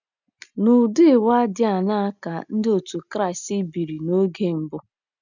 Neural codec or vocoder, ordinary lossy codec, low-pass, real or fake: none; none; 7.2 kHz; real